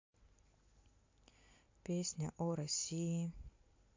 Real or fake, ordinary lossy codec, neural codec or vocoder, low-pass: real; MP3, 48 kbps; none; 7.2 kHz